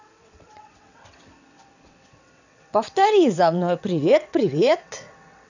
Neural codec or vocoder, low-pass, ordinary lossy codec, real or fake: vocoder, 22.05 kHz, 80 mel bands, WaveNeXt; 7.2 kHz; none; fake